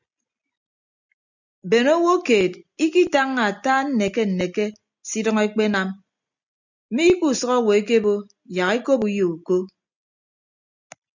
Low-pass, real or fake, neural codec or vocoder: 7.2 kHz; real; none